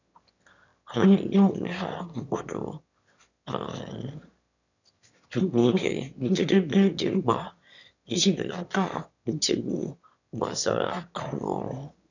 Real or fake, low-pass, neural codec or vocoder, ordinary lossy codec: fake; 7.2 kHz; autoencoder, 22.05 kHz, a latent of 192 numbers a frame, VITS, trained on one speaker; none